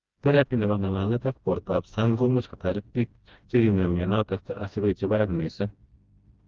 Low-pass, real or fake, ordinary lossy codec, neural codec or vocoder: 7.2 kHz; fake; Opus, 32 kbps; codec, 16 kHz, 1 kbps, FreqCodec, smaller model